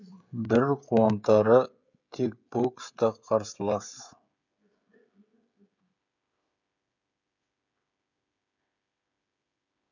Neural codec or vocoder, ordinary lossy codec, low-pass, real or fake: codec, 16 kHz, 16 kbps, FreqCodec, smaller model; none; 7.2 kHz; fake